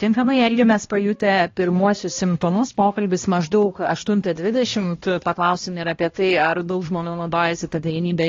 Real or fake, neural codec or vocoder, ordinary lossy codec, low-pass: fake; codec, 16 kHz, 1 kbps, X-Codec, HuBERT features, trained on balanced general audio; AAC, 32 kbps; 7.2 kHz